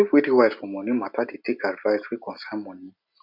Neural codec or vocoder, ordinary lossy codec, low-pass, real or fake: none; none; 5.4 kHz; real